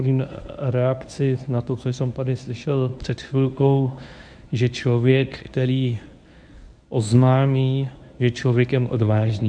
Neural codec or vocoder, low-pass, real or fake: codec, 24 kHz, 0.9 kbps, WavTokenizer, medium speech release version 1; 9.9 kHz; fake